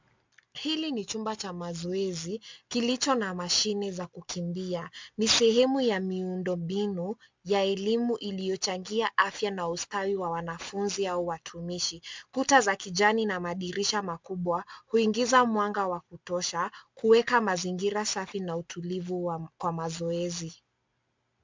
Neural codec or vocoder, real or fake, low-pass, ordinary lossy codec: none; real; 7.2 kHz; MP3, 64 kbps